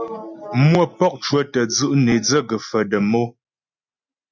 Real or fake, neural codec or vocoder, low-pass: real; none; 7.2 kHz